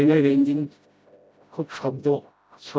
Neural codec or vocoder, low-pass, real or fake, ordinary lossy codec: codec, 16 kHz, 0.5 kbps, FreqCodec, smaller model; none; fake; none